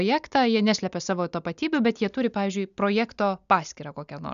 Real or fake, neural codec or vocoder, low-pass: real; none; 7.2 kHz